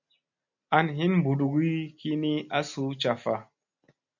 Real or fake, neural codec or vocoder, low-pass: real; none; 7.2 kHz